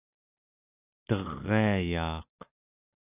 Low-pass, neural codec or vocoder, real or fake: 3.6 kHz; none; real